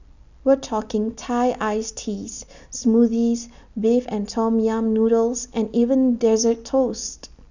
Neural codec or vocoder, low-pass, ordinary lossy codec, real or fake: none; 7.2 kHz; none; real